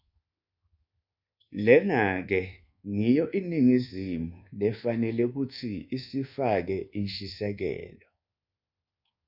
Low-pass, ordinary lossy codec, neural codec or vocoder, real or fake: 5.4 kHz; Opus, 64 kbps; codec, 24 kHz, 1.2 kbps, DualCodec; fake